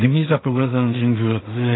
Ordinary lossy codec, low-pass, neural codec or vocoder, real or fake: AAC, 16 kbps; 7.2 kHz; codec, 16 kHz in and 24 kHz out, 0.4 kbps, LongCat-Audio-Codec, two codebook decoder; fake